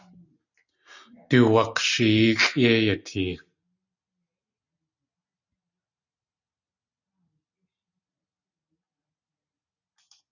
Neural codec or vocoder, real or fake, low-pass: none; real; 7.2 kHz